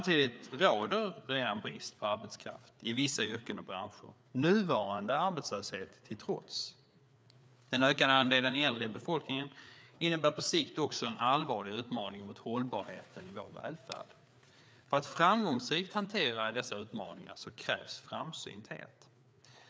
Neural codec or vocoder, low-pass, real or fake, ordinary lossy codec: codec, 16 kHz, 4 kbps, FreqCodec, larger model; none; fake; none